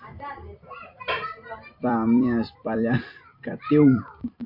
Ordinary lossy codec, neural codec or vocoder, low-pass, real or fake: MP3, 32 kbps; vocoder, 44.1 kHz, 128 mel bands every 256 samples, BigVGAN v2; 5.4 kHz; fake